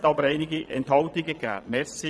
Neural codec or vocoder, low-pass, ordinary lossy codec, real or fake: vocoder, 22.05 kHz, 80 mel bands, Vocos; none; none; fake